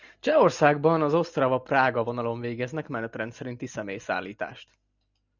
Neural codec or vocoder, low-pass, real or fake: none; 7.2 kHz; real